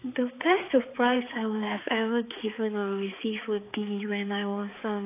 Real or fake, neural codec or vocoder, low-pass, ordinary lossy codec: fake; codec, 16 kHz, 4 kbps, X-Codec, HuBERT features, trained on balanced general audio; 3.6 kHz; AAC, 24 kbps